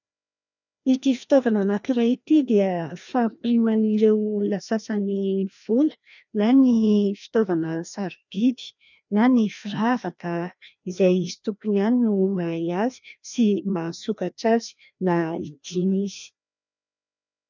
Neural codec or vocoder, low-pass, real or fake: codec, 16 kHz, 1 kbps, FreqCodec, larger model; 7.2 kHz; fake